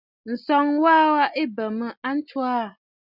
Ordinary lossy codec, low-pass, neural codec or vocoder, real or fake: Opus, 64 kbps; 5.4 kHz; none; real